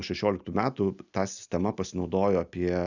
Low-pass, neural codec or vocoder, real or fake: 7.2 kHz; none; real